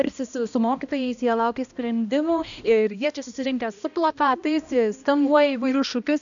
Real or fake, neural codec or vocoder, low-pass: fake; codec, 16 kHz, 1 kbps, X-Codec, HuBERT features, trained on balanced general audio; 7.2 kHz